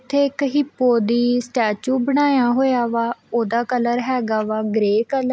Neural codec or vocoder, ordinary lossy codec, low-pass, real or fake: none; none; none; real